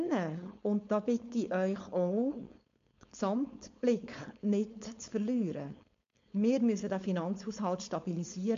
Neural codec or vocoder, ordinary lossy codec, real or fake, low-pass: codec, 16 kHz, 4.8 kbps, FACodec; MP3, 48 kbps; fake; 7.2 kHz